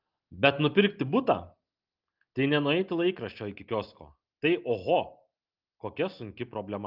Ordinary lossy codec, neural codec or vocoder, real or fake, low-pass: Opus, 32 kbps; none; real; 5.4 kHz